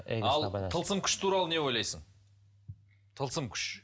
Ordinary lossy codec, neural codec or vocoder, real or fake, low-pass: none; none; real; none